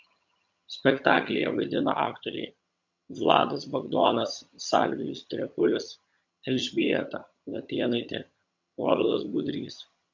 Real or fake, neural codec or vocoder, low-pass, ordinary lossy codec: fake; vocoder, 22.05 kHz, 80 mel bands, HiFi-GAN; 7.2 kHz; MP3, 48 kbps